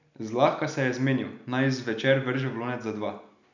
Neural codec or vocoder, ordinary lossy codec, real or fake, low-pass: none; none; real; 7.2 kHz